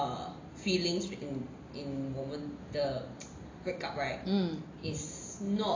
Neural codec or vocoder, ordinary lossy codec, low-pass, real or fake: none; AAC, 32 kbps; 7.2 kHz; real